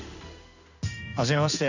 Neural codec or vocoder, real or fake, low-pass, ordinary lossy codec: codec, 16 kHz in and 24 kHz out, 1 kbps, XY-Tokenizer; fake; 7.2 kHz; none